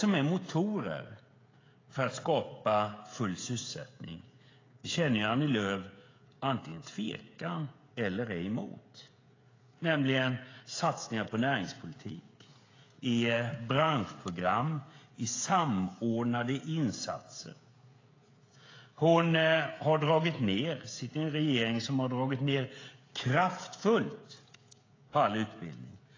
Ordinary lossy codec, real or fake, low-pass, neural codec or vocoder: AAC, 32 kbps; fake; 7.2 kHz; codec, 16 kHz, 16 kbps, FreqCodec, smaller model